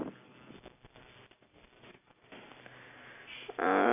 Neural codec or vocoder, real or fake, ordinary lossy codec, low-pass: none; real; none; 3.6 kHz